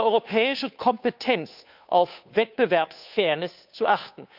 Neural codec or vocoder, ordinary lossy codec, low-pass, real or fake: codec, 16 kHz, 2 kbps, FunCodec, trained on Chinese and English, 25 frames a second; none; 5.4 kHz; fake